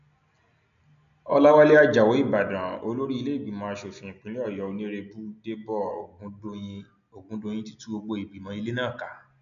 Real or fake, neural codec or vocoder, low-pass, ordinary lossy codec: real; none; 7.2 kHz; none